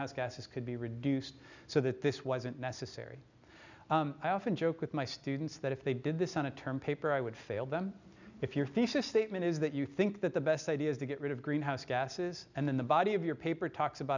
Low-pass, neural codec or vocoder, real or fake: 7.2 kHz; none; real